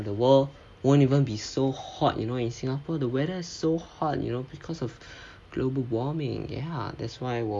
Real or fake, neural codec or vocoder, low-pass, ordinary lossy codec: real; none; none; none